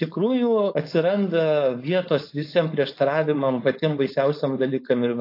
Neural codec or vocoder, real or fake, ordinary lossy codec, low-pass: codec, 16 kHz, 4.8 kbps, FACodec; fake; AAC, 32 kbps; 5.4 kHz